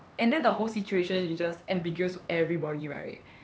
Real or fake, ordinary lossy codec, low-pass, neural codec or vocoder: fake; none; none; codec, 16 kHz, 2 kbps, X-Codec, HuBERT features, trained on LibriSpeech